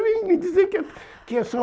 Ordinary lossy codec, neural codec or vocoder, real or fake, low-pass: none; none; real; none